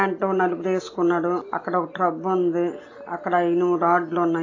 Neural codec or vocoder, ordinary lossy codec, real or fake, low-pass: none; AAC, 32 kbps; real; 7.2 kHz